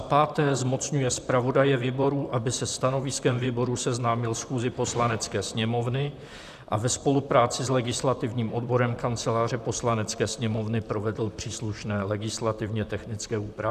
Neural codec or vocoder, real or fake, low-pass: vocoder, 44.1 kHz, 128 mel bands, Pupu-Vocoder; fake; 14.4 kHz